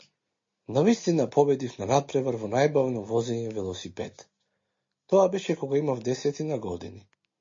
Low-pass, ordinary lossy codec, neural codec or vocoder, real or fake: 7.2 kHz; MP3, 32 kbps; none; real